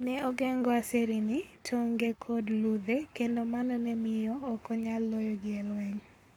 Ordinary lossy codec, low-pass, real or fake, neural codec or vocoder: none; 19.8 kHz; fake; codec, 44.1 kHz, 7.8 kbps, Pupu-Codec